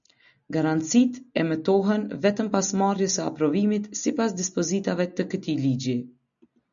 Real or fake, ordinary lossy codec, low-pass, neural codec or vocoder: real; MP3, 96 kbps; 7.2 kHz; none